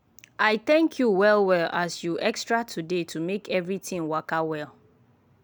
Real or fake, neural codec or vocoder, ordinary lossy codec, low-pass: real; none; none; none